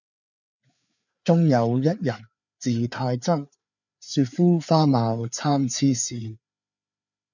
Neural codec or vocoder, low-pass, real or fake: codec, 16 kHz, 4 kbps, FreqCodec, larger model; 7.2 kHz; fake